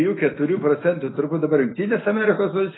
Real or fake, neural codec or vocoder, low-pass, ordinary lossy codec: real; none; 7.2 kHz; AAC, 16 kbps